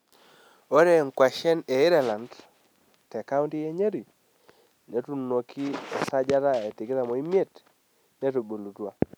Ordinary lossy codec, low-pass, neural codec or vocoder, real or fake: none; none; none; real